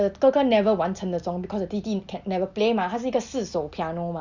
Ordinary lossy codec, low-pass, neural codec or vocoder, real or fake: Opus, 64 kbps; 7.2 kHz; none; real